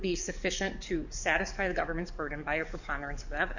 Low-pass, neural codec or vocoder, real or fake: 7.2 kHz; codec, 44.1 kHz, 7.8 kbps, DAC; fake